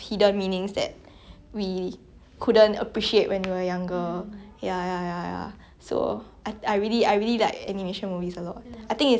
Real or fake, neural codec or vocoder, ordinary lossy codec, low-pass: real; none; none; none